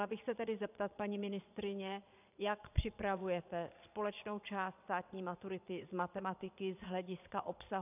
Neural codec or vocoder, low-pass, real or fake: vocoder, 22.05 kHz, 80 mel bands, Vocos; 3.6 kHz; fake